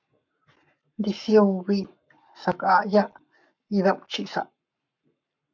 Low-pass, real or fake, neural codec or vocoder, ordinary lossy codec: 7.2 kHz; fake; codec, 44.1 kHz, 7.8 kbps, Pupu-Codec; MP3, 64 kbps